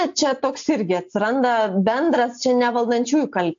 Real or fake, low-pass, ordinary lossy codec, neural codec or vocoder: real; 7.2 kHz; AAC, 64 kbps; none